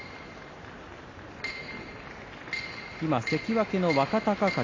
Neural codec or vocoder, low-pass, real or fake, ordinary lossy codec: none; 7.2 kHz; real; AAC, 32 kbps